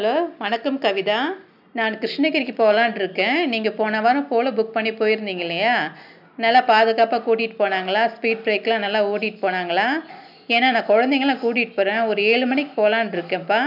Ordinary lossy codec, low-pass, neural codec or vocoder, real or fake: none; 5.4 kHz; none; real